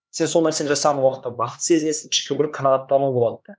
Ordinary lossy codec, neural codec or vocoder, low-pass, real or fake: none; codec, 16 kHz, 2 kbps, X-Codec, HuBERT features, trained on LibriSpeech; none; fake